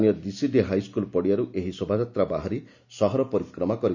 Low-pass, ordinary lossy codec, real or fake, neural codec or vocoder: 7.2 kHz; none; real; none